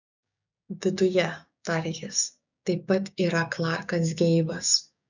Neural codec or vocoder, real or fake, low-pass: codec, 16 kHz, 6 kbps, DAC; fake; 7.2 kHz